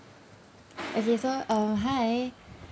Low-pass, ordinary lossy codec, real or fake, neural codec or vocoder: none; none; real; none